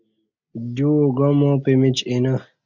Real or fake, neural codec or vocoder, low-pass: real; none; 7.2 kHz